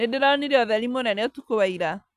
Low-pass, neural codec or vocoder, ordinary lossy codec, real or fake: 14.4 kHz; none; none; real